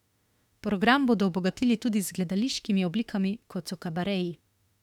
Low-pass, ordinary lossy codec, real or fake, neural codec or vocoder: 19.8 kHz; none; fake; autoencoder, 48 kHz, 32 numbers a frame, DAC-VAE, trained on Japanese speech